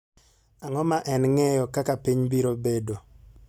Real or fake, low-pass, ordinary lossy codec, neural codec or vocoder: real; 19.8 kHz; none; none